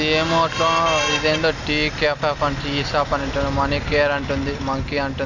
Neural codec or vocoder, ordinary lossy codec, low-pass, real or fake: none; none; 7.2 kHz; real